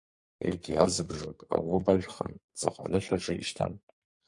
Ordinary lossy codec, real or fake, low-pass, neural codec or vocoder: MP3, 48 kbps; fake; 10.8 kHz; codec, 44.1 kHz, 2.6 kbps, SNAC